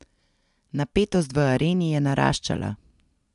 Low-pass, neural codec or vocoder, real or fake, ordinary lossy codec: 10.8 kHz; none; real; MP3, 96 kbps